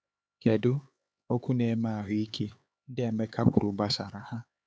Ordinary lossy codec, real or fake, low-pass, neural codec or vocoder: none; fake; none; codec, 16 kHz, 4 kbps, X-Codec, HuBERT features, trained on LibriSpeech